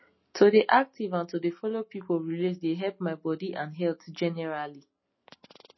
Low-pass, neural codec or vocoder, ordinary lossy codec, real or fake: 7.2 kHz; none; MP3, 24 kbps; real